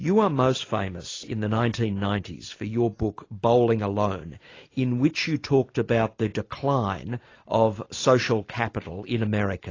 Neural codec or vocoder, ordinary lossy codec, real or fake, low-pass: none; AAC, 32 kbps; real; 7.2 kHz